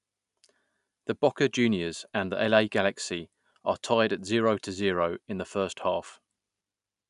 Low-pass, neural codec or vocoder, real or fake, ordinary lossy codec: 10.8 kHz; none; real; none